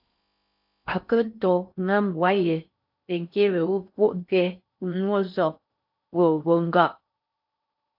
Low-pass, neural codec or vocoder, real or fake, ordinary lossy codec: 5.4 kHz; codec, 16 kHz in and 24 kHz out, 0.6 kbps, FocalCodec, streaming, 4096 codes; fake; AAC, 48 kbps